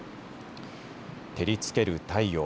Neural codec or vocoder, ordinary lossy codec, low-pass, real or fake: none; none; none; real